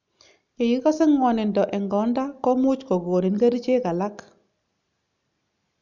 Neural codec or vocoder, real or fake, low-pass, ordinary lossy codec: none; real; 7.2 kHz; none